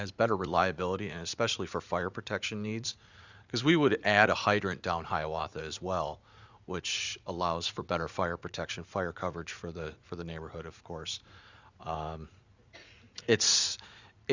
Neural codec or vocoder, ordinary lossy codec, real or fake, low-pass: vocoder, 44.1 kHz, 80 mel bands, Vocos; Opus, 64 kbps; fake; 7.2 kHz